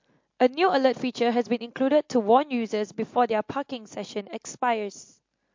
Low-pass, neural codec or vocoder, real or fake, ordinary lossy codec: 7.2 kHz; none; real; MP3, 48 kbps